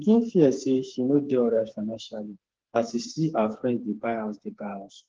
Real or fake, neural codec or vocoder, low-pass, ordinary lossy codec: fake; codec, 16 kHz, 4 kbps, FreqCodec, smaller model; 7.2 kHz; Opus, 16 kbps